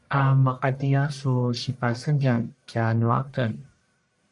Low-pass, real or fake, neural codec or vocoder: 10.8 kHz; fake; codec, 44.1 kHz, 1.7 kbps, Pupu-Codec